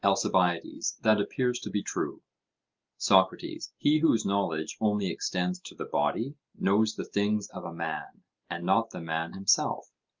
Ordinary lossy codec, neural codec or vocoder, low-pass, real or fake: Opus, 24 kbps; none; 7.2 kHz; real